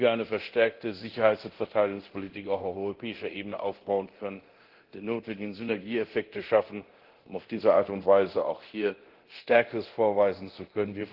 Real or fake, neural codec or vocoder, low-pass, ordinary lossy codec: fake; codec, 24 kHz, 0.9 kbps, DualCodec; 5.4 kHz; Opus, 16 kbps